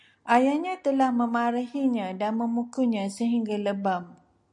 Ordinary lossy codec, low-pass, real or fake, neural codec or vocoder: MP3, 96 kbps; 10.8 kHz; real; none